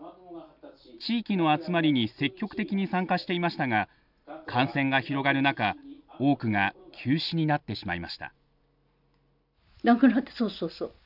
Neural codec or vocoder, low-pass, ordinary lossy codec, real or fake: none; 5.4 kHz; none; real